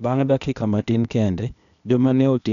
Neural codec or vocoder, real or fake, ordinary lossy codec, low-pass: codec, 16 kHz, 0.8 kbps, ZipCodec; fake; none; 7.2 kHz